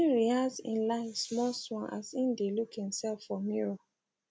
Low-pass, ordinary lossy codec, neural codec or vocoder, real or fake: none; none; none; real